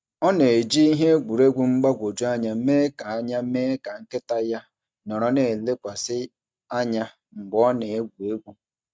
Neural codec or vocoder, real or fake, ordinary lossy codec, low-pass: none; real; none; none